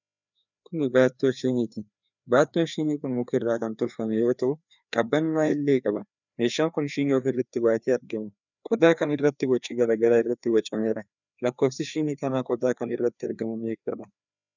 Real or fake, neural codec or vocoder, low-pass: fake; codec, 16 kHz, 2 kbps, FreqCodec, larger model; 7.2 kHz